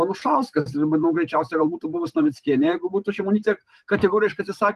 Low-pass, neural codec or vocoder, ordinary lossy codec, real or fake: 14.4 kHz; vocoder, 44.1 kHz, 128 mel bands every 512 samples, BigVGAN v2; Opus, 24 kbps; fake